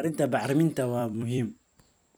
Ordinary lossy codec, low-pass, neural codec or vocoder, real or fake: none; none; vocoder, 44.1 kHz, 128 mel bands every 256 samples, BigVGAN v2; fake